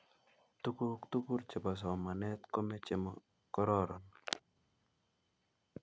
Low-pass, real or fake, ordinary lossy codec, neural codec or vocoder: none; real; none; none